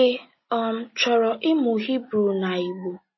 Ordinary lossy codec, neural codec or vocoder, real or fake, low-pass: MP3, 24 kbps; none; real; 7.2 kHz